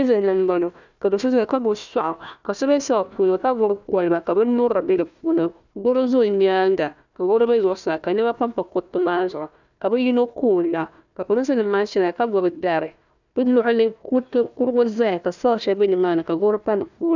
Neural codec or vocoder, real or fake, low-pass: codec, 16 kHz, 1 kbps, FunCodec, trained on Chinese and English, 50 frames a second; fake; 7.2 kHz